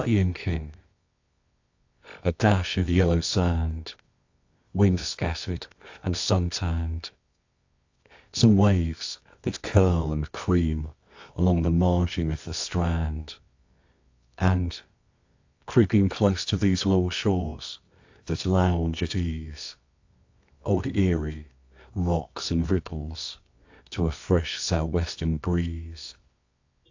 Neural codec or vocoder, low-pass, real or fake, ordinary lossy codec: codec, 24 kHz, 0.9 kbps, WavTokenizer, medium music audio release; 7.2 kHz; fake; AAC, 48 kbps